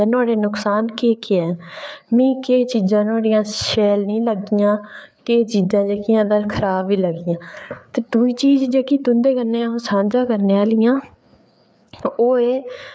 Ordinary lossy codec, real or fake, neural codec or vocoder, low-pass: none; fake; codec, 16 kHz, 4 kbps, FreqCodec, larger model; none